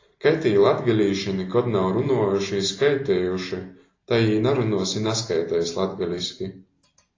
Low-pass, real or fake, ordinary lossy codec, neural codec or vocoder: 7.2 kHz; real; AAC, 32 kbps; none